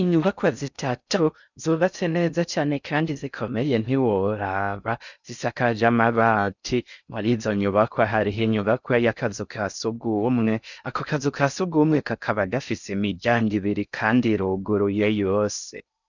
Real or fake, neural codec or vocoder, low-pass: fake; codec, 16 kHz in and 24 kHz out, 0.6 kbps, FocalCodec, streaming, 4096 codes; 7.2 kHz